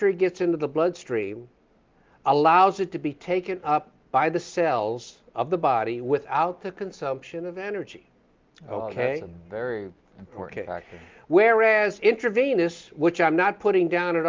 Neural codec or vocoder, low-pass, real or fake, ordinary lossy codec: none; 7.2 kHz; real; Opus, 32 kbps